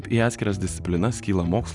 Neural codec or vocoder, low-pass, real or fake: none; 10.8 kHz; real